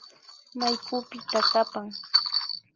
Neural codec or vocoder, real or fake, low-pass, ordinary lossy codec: none; real; 7.2 kHz; Opus, 32 kbps